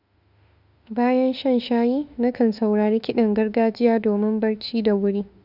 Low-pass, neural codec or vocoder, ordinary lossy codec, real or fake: 5.4 kHz; autoencoder, 48 kHz, 32 numbers a frame, DAC-VAE, trained on Japanese speech; none; fake